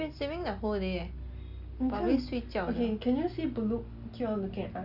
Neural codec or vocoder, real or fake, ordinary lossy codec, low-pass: none; real; Opus, 64 kbps; 5.4 kHz